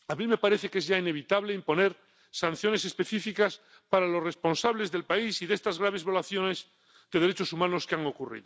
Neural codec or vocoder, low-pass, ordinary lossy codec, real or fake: none; none; none; real